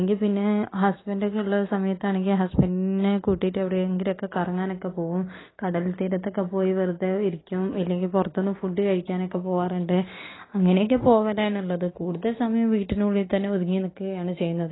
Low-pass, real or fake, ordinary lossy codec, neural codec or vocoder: 7.2 kHz; real; AAC, 16 kbps; none